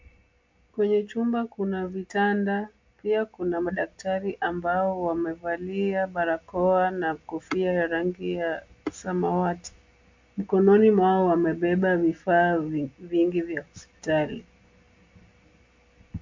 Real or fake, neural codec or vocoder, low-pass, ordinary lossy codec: real; none; 7.2 kHz; MP3, 48 kbps